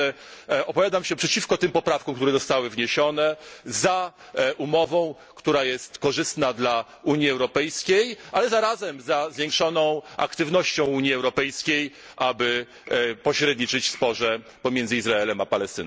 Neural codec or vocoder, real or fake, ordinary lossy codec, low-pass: none; real; none; none